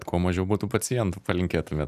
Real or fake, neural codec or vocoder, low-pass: real; none; 14.4 kHz